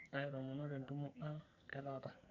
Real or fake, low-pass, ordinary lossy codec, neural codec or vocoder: fake; 7.2 kHz; none; codec, 44.1 kHz, 2.6 kbps, SNAC